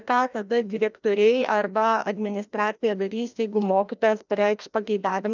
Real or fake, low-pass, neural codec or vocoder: fake; 7.2 kHz; codec, 16 kHz, 1 kbps, FreqCodec, larger model